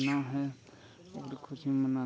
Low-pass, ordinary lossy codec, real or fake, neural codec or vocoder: none; none; real; none